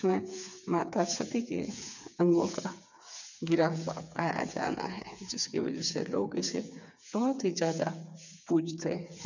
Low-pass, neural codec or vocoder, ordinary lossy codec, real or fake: 7.2 kHz; codec, 16 kHz, 4 kbps, FreqCodec, smaller model; none; fake